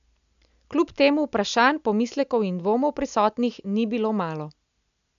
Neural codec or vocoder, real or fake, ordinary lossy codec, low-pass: none; real; none; 7.2 kHz